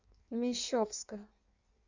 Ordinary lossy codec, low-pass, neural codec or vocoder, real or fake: Opus, 64 kbps; 7.2 kHz; codec, 16 kHz in and 24 kHz out, 1.1 kbps, FireRedTTS-2 codec; fake